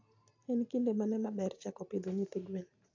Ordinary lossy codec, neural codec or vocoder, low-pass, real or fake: AAC, 48 kbps; none; 7.2 kHz; real